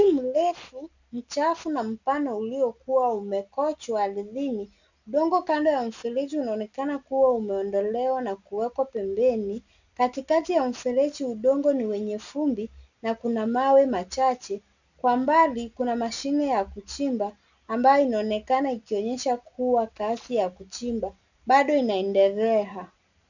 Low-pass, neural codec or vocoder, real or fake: 7.2 kHz; none; real